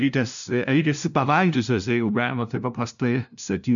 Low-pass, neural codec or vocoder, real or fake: 7.2 kHz; codec, 16 kHz, 0.5 kbps, FunCodec, trained on LibriTTS, 25 frames a second; fake